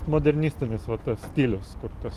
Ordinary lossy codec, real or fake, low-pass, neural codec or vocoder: Opus, 24 kbps; real; 14.4 kHz; none